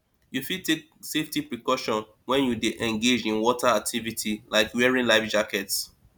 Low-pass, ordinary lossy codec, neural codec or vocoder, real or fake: none; none; none; real